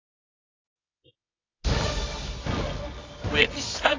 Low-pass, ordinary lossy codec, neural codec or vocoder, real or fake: 7.2 kHz; AAC, 32 kbps; codec, 24 kHz, 0.9 kbps, WavTokenizer, medium music audio release; fake